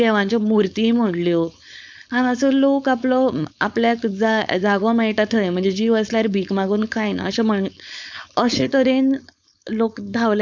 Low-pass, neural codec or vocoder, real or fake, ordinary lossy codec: none; codec, 16 kHz, 4.8 kbps, FACodec; fake; none